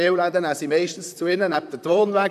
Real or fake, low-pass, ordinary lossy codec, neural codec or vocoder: fake; 14.4 kHz; none; vocoder, 44.1 kHz, 128 mel bands, Pupu-Vocoder